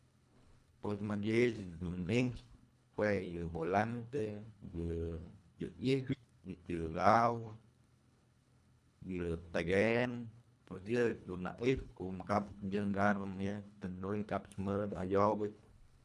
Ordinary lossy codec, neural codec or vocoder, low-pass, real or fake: none; codec, 24 kHz, 1.5 kbps, HILCodec; none; fake